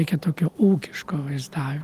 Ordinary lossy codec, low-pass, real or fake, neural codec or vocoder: Opus, 24 kbps; 14.4 kHz; real; none